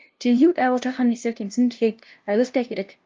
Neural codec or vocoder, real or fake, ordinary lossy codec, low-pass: codec, 16 kHz, 0.5 kbps, FunCodec, trained on LibriTTS, 25 frames a second; fake; Opus, 24 kbps; 7.2 kHz